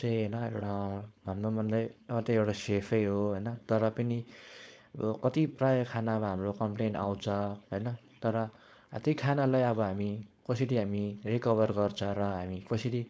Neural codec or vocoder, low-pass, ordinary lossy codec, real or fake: codec, 16 kHz, 4.8 kbps, FACodec; none; none; fake